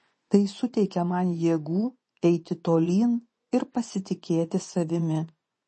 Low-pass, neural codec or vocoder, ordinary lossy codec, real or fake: 10.8 kHz; vocoder, 24 kHz, 100 mel bands, Vocos; MP3, 32 kbps; fake